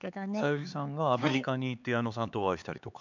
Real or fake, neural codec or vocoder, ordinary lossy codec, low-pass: fake; codec, 16 kHz, 4 kbps, X-Codec, HuBERT features, trained on LibriSpeech; none; 7.2 kHz